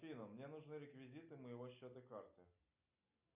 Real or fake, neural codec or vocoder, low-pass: real; none; 3.6 kHz